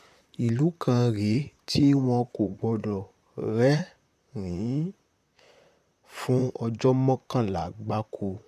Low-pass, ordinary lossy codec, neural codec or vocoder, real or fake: 14.4 kHz; none; vocoder, 44.1 kHz, 128 mel bands, Pupu-Vocoder; fake